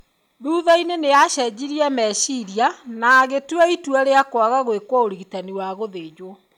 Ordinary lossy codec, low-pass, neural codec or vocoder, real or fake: none; 19.8 kHz; none; real